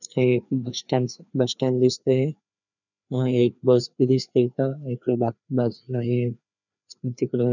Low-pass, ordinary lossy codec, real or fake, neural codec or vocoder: 7.2 kHz; none; fake; codec, 16 kHz, 2 kbps, FreqCodec, larger model